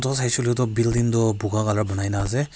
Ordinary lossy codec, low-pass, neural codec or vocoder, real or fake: none; none; none; real